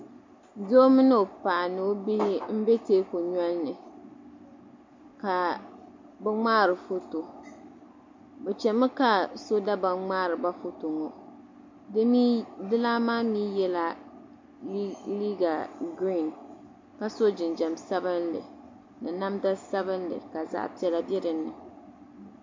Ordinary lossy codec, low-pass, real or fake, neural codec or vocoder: MP3, 48 kbps; 7.2 kHz; real; none